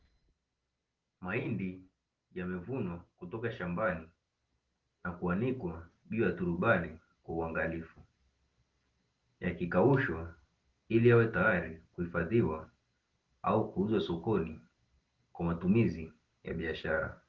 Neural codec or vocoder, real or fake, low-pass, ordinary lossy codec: none; real; 7.2 kHz; Opus, 16 kbps